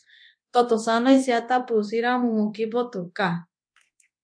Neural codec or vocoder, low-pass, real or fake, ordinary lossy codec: codec, 24 kHz, 0.9 kbps, DualCodec; 9.9 kHz; fake; MP3, 48 kbps